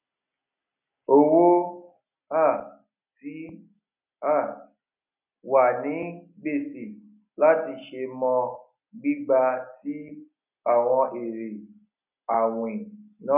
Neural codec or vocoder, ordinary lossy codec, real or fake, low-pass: none; none; real; 3.6 kHz